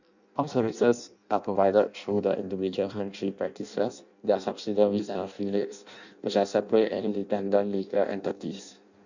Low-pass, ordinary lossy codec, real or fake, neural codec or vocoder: 7.2 kHz; none; fake; codec, 16 kHz in and 24 kHz out, 0.6 kbps, FireRedTTS-2 codec